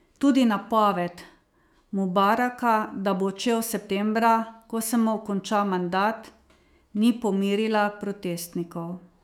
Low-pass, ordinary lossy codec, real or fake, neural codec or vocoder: 19.8 kHz; none; fake; autoencoder, 48 kHz, 128 numbers a frame, DAC-VAE, trained on Japanese speech